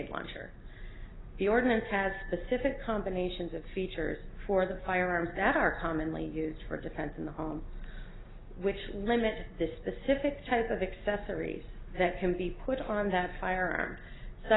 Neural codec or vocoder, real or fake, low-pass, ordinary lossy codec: none; real; 7.2 kHz; AAC, 16 kbps